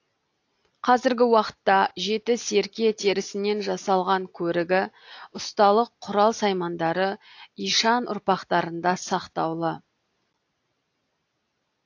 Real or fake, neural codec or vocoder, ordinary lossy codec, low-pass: real; none; AAC, 48 kbps; 7.2 kHz